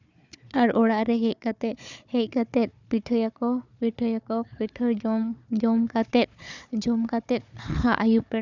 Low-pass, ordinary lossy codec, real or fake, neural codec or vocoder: 7.2 kHz; none; fake; codec, 16 kHz, 4 kbps, FunCodec, trained on Chinese and English, 50 frames a second